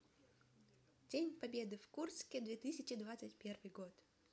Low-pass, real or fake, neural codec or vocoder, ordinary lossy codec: none; real; none; none